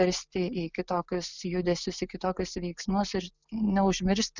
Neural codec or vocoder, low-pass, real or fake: none; 7.2 kHz; real